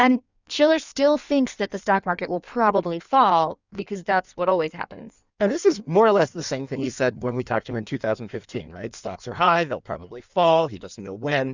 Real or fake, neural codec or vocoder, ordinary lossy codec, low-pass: fake; codec, 16 kHz in and 24 kHz out, 1.1 kbps, FireRedTTS-2 codec; Opus, 64 kbps; 7.2 kHz